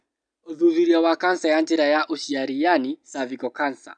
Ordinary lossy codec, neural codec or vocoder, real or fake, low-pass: none; none; real; 9.9 kHz